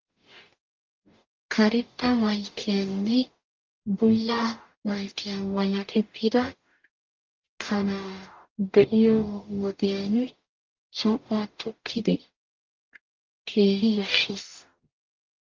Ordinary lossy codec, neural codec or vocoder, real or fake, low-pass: Opus, 24 kbps; codec, 44.1 kHz, 0.9 kbps, DAC; fake; 7.2 kHz